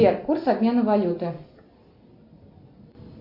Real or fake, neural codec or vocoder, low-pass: real; none; 5.4 kHz